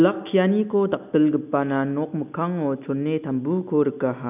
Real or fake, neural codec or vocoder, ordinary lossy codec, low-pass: real; none; none; 3.6 kHz